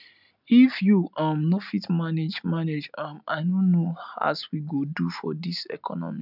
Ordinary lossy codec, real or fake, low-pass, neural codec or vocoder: none; real; 5.4 kHz; none